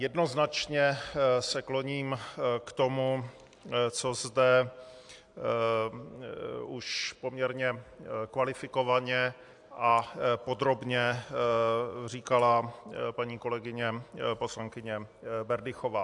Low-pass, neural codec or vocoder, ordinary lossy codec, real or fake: 10.8 kHz; none; AAC, 64 kbps; real